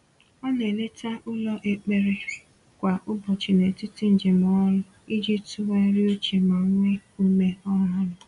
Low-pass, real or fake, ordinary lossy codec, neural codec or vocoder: 10.8 kHz; real; none; none